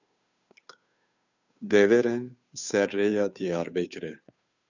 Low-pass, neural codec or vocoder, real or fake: 7.2 kHz; codec, 16 kHz, 2 kbps, FunCodec, trained on Chinese and English, 25 frames a second; fake